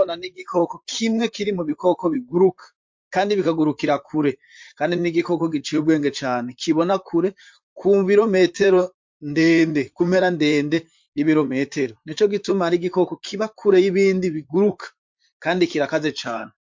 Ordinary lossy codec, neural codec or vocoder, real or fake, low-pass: MP3, 48 kbps; vocoder, 44.1 kHz, 128 mel bands, Pupu-Vocoder; fake; 7.2 kHz